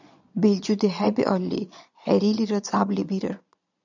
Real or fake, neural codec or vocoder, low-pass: fake; vocoder, 22.05 kHz, 80 mel bands, Vocos; 7.2 kHz